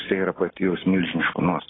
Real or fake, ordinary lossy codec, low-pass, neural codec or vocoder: real; AAC, 16 kbps; 7.2 kHz; none